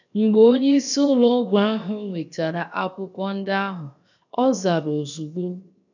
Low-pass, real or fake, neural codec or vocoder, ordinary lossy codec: 7.2 kHz; fake; codec, 16 kHz, 0.7 kbps, FocalCodec; none